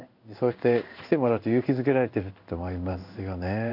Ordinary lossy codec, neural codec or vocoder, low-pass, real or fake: none; codec, 16 kHz in and 24 kHz out, 1 kbps, XY-Tokenizer; 5.4 kHz; fake